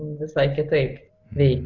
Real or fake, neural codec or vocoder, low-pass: real; none; 7.2 kHz